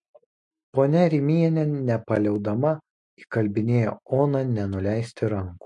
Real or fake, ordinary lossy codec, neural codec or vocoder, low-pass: real; MP3, 48 kbps; none; 10.8 kHz